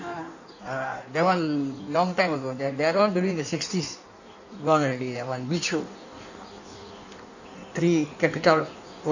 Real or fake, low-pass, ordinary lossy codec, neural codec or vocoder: fake; 7.2 kHz; none; codec, 16 kHz in and 24 kHz out, 1.1 kbps, FireRedTTS-2 codec